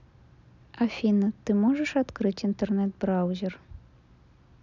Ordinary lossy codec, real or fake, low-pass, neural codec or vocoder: none; real; 7.2 kHz; none